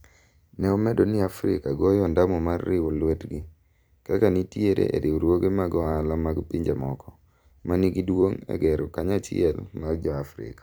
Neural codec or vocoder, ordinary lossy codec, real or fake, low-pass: vocoder, 44.1 kHz, 128 mel bands every 256 samples, BigVGAN v2; none; fake; none